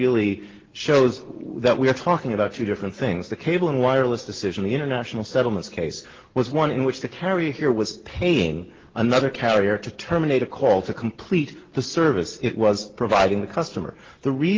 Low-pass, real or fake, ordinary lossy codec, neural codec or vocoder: 7.2 kHz; real; Opus, 16 kbps; none